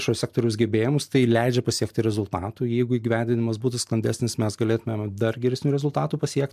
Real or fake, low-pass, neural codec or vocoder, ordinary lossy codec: real; 14.4 kHz; none; MP3, 96 kbps